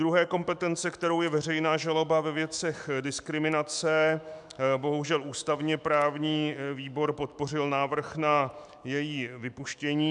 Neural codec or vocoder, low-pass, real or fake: autoencoder, 48 kHz, 128 numbers a frame, DAC-VAE, trained on Japanese speech; 10.8 kHz; fake